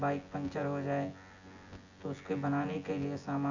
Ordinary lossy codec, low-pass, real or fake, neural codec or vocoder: Opus, 64 kbps; 7.2 kHz; fake; vocoder, 24 kHz, 100 mel bands, Vocos